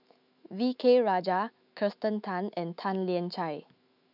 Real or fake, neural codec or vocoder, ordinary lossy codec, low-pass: fake; autoencoder, 48 kHz, 128 numbers a frame, DAC-VAE, trained on Japanese speech; none; 5.4 kHz